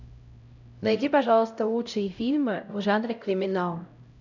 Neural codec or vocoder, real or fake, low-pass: codec, 16 kHz, 0.5 kbps, X-Codec, HuBERT features, trained on LibriSpeech; fake; 7.2 kHz